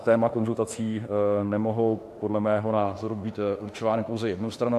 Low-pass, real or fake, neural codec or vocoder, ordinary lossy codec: 14.4 kHz; fake; autoencoder, 48 kHz, 32 numbers a frame, DAC-VAE, trained on Japanese speech; AAC, 64 kbps